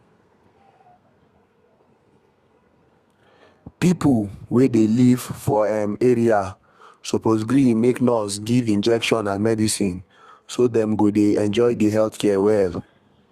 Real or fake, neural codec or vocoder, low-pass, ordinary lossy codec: fake; codec, 32 kHz, 1.9 kbps, SNAC; 14.4 kHz; Opus, 64 kbps